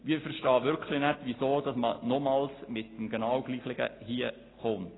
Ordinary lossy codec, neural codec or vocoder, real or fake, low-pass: AAC, 16 kbps; none; real; 7.2 kHz